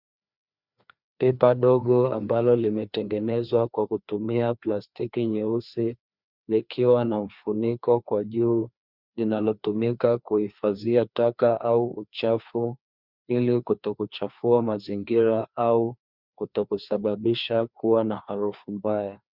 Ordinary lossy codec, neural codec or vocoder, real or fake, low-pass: Opus, 64 kbps; codec, 16 kHz, 2 kbps, FreqCodec, larger model; fake; 5.4 kHz